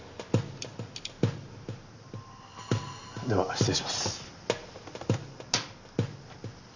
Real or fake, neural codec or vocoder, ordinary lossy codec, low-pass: real; none; none; 7.2 kHz